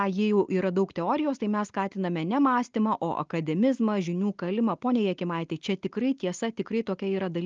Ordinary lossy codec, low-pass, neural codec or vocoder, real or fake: Opus, 24 kbps; 7.2 kHz; none; real